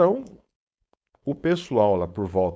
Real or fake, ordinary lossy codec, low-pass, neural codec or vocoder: fake; none; none; codec, 16 kHz, 4.8 kbps, FACodec